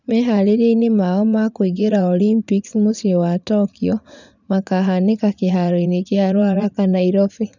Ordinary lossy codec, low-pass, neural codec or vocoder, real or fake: none; 7.2 kHz; vocoder, 44.1 kHz, 80 mel bands, Vocos; fake